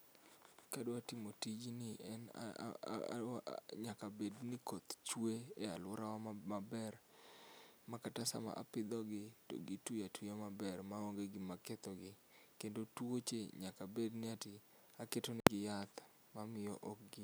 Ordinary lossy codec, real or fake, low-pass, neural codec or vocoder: none; real; none; none